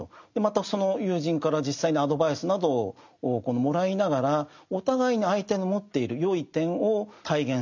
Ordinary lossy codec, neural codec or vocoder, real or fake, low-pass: none; none; real; 7.2 kHz